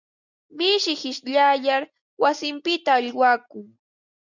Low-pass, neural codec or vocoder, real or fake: 7.2 kHz; none; real